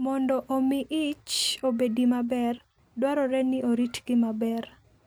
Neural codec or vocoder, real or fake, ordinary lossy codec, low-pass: none; real; none; none